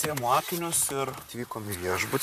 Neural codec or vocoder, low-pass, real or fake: vocoder, 44.1 kHz, 128 mel bands, Pupu-Vocoder; 14.4 kHz; fake